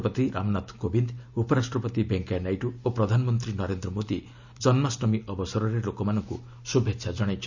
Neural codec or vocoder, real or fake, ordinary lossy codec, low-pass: none; real; none; 7.2 kHz